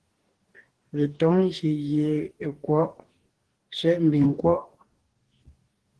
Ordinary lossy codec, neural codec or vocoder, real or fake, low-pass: Opus, 16 kbps; codec, 44.1 kHz, 2.6 kbps, DAC; fake; 10.8 kHz